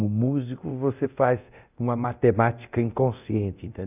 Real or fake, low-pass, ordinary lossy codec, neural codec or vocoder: fake; 3.6 kHz; none; codec, 24 kHz, 0.9 kbps, DualCodec